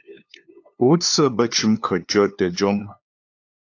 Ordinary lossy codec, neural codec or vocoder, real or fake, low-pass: AAC, 48 kbps; codec, 16 kHz, 2 kbps, FunCodec, trained on LibriTTS, 25 frames a second; fake; 7.2 kHz